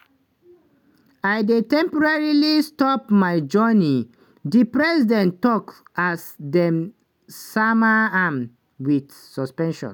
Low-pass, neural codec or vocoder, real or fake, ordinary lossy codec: 19.8 kHz; none; real; none